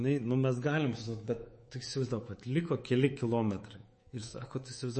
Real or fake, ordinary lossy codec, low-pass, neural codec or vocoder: fake; MP3, 32 kbps; 10.8 kHz; codec, 24 kHz, 3.1 kbps, DualCodec